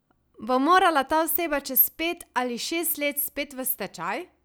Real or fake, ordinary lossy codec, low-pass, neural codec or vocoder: real; none; none; none